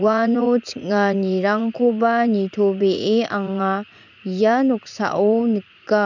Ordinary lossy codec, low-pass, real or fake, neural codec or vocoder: none; 7.2 kHz; fake; vocoder, 44.1 kHz, 80 mel bands, Vocos